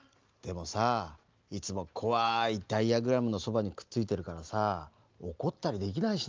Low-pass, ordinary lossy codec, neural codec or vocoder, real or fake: 7.2 kHz; Opus, 32 kbps; none; real